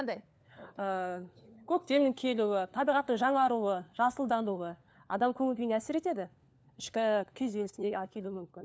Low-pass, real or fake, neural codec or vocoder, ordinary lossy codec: none; fake; codec, 16 kHz, 4 kbps, FunCodec, trained on LibriTTS, 50 frames a second; none